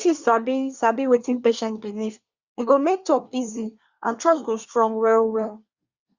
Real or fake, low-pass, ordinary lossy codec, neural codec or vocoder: fake; 7.2 kHz; Opus, 64 kbps; codec, 24 kHz, 1 kbps, SNAC